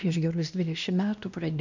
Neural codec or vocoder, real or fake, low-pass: codec, 16 kHz, 1 kbps, X-Codec, WavLM features, trained on Multilingual LibriSpeech; fake; 7.2 kHz